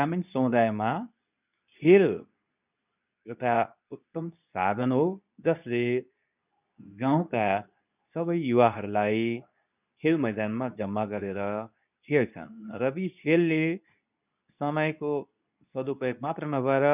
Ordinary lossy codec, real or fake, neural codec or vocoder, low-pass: none; fake; codec, 24 kHz, 0.9 kbps, WavTokenizer, medium speech release version 2; 3.6 kHz